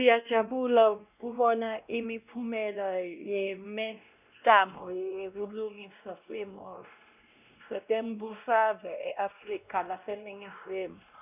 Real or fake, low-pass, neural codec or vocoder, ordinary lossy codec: fake; 3.6 kHz; codec, 16 kHz, 1 kbps, X-Codec, WavLM features, trained on Multilingual LibriSpeech; none